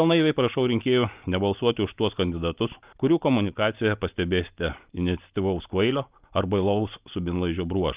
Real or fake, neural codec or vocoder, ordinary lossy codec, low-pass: real; none; Opus, 32 kbps; 3.6 kHz